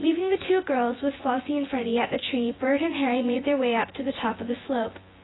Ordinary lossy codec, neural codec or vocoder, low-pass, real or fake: AAC, 16 kbps; vocoder, 24 kHz, 100 mel bands, Vocos; 7.2 kHz; fake